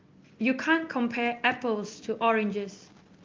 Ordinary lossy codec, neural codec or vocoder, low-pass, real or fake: Opus, 32 kbps; none; 7.2 kHz; real